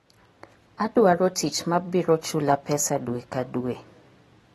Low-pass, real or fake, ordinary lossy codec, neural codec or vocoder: 19.8 kHz; real; AAC, 32 kbps; none